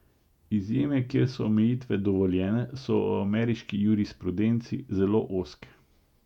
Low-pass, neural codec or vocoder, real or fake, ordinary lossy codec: 19.8 kHz; vocoder, 48 kHz, 128 mel bands, Vocos; fake; none